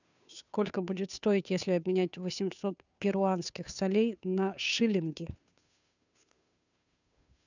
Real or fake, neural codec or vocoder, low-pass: fake; codec, 16 kHz, 2 kbps, FunCodec, trained on Chinese and English, 25 frames a second; 7.2 kHz